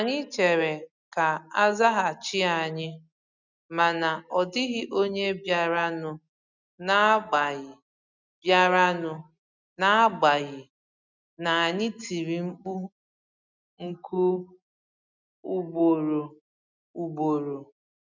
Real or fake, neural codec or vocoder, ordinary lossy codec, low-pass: real; none; none; 7.2 kHz